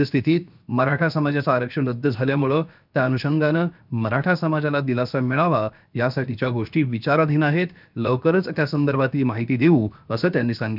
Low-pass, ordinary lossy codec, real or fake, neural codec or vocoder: 5.4 kHz; none; fake; codec, 16 kHz, 0.7 kbps, FocalCodec